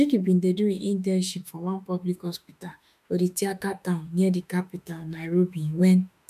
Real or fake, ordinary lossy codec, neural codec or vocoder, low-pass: fake; none; autoencoder, 48 kHz, 32 numbers a frame, DAC-VAE, trained on Japanese speech; 14.4 kHz